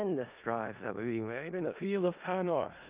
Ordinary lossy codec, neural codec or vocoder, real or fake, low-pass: Opus, 24 kbps; codec, 16 kHz in and 24 kHz out, 0.4 kbps, LongCat-Audio-Codec, four codebook decoder; fake; 3.6 kHz